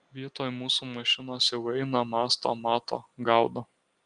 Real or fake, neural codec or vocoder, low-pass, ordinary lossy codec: real; none; 9.9 kHz; Opus, 32 kbps